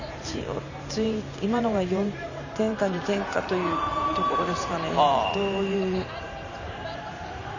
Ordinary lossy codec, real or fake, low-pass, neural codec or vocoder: none; fake; 7.2 kHz; vocoder, 44.1 kHz, 128 mel bands every 512 samples, BigVGAN v2